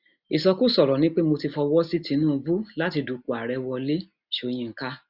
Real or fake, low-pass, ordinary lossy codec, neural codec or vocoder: real; 5.4 kHz; Opus, 64 kbps; none